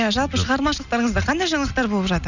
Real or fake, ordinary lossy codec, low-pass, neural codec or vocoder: real; none; 7.2 kHz; none